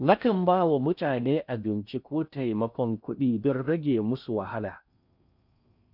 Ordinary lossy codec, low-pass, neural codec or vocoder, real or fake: none; 5.4 kHz; codec, 16 kHz in and 24 kHz out, 0.6 kbps, FocalCodec, streaming, 4096 codes; fake